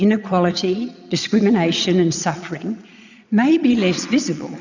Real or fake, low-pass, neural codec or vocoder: fake; 7.2 kHz; vocoder, 22.05 kHz, 80 mel bands, Vocos